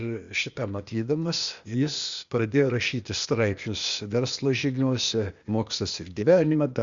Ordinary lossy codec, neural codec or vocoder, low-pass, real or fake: Opus, 64 kbps; codec, 16 kHz, 0.8 kbps, ZipCodec; 7.2 kHz; fake